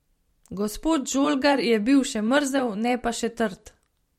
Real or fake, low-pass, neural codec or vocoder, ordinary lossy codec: fake; 19.8 kHz; vocoder, 44.1 kHz, 128 mel bands every 512 samples, BigVGAN v2; MP3, 64 kbps